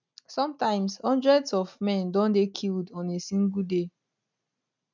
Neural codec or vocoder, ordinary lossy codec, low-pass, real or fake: none; none; 7.2 kHz; real